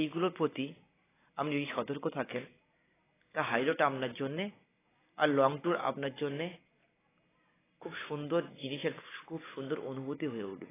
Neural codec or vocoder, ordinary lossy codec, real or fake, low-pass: none; AAC, 16 kbps; real; 3.6 kHz